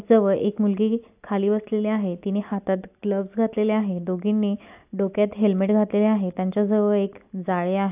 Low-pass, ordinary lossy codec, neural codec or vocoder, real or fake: 3.6 kHz; AAC, 32 kbps; none; real